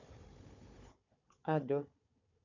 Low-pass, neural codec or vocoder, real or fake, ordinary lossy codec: 7.2 kHz; codec, 16 kHz, 4 kbps, FunCodec, trained on Chinese and English, 50 frames a second; fake; none